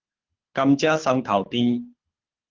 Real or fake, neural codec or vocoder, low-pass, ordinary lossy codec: fake; codec, 24 kHz, 6 kbps, HILCodec; 7.2 kHz; Opus, 16 kbps